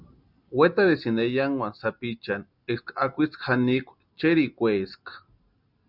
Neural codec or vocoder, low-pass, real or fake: none; 5.4 kHz; real